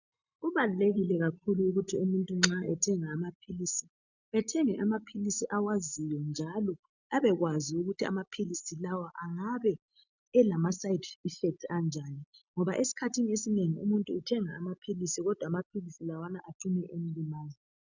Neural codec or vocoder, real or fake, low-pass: none; real; 7.2 kHz